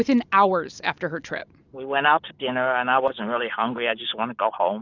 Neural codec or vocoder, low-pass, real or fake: none; 7.2 kHz; real